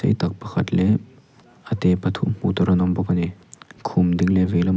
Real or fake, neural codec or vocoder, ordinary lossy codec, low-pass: real; none; none; none